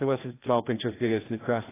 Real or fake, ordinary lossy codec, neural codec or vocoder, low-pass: fake; AAC, 16 kbps; codec, 16 kHz, 1 kbps, FreqCodec, larger model; 3.6 kHz